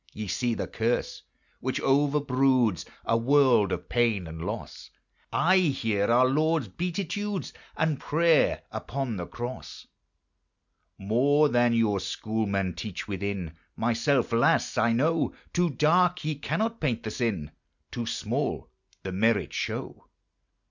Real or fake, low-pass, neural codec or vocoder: real; 7.2 kHz; none